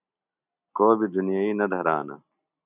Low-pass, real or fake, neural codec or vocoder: 3.6 kHz; real; none